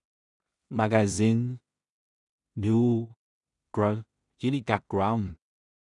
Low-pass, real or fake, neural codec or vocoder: 10.8 kHz; fake; codec, 16 kHz in and 24 kHz out, 0.4 kbps, LongCat-Audio-Codec, two codebook decoder